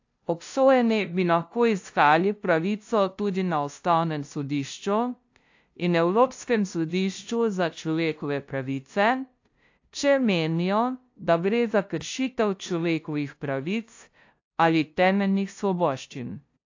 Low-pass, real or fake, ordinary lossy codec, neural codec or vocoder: 7.2 kHz; fake; AAC, 48 kbps; codec, 16 kHz, 0.5 kbps, FunCodec, trained on LibriTTS, 25 frames a second